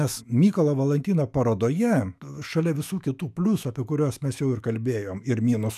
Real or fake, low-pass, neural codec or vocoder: fake; 14.4 kHz; autoencoder, 48 kHz, 128 numbers a frame, DAC-VAE, trained on Japanese speech